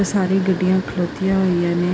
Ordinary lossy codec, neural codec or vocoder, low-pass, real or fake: none; none; none; real